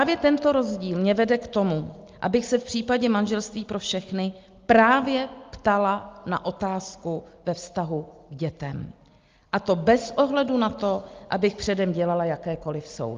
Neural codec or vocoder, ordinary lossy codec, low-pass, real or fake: none; Opus, 32 kbps; 7.2 kHz; real